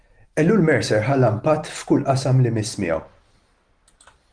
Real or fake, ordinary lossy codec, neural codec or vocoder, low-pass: real; Opus, 24 kbps; none; 9.9 kHz